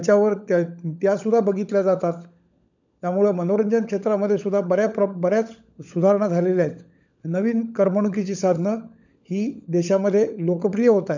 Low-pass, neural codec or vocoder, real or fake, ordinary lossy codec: 7.2 kHz; codec, 16 kHz, 16 kbps, FunCodec, trained on LibriTTS, 50 frames a second; fake; none